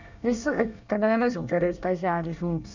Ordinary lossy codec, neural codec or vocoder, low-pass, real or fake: none; codec, 24 kHz, 1 kbps, SNAC; 7.2 kHz; fake